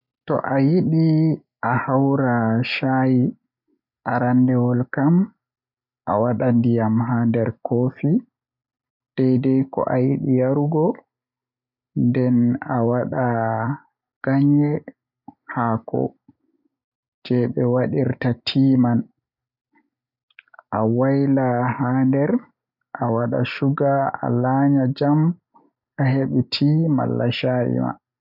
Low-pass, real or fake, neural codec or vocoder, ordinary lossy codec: 5.4 kHz; real; none; none